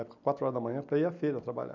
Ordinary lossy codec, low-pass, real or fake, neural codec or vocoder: none; 7.2 kHz; fake; vocoder, 44.1 kHz, 128 mel bands every 256 samples, BigVGAN v2